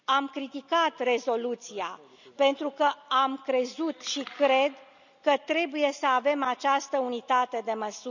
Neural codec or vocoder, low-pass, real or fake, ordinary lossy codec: none; 7.2 kHz; real; none